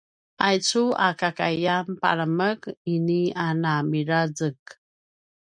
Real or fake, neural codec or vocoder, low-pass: real; none; 9.9 kHz